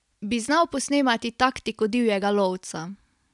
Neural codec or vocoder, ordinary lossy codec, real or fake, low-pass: none; none; real; 10.8 kHz